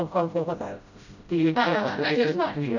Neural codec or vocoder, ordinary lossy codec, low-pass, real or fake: codec, 16 kHz, 0.5 kbps, FreqCodec, smaller model; none; 7.2 kHz; fake